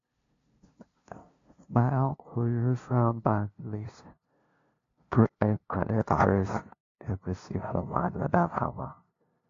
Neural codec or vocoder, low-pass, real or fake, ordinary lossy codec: codec, 16 kHz, 0.5 kbps, FunCodec, trained on LibriTTS, 25 frames a second; 7.2 kHz; fake; AAC, 48 kbps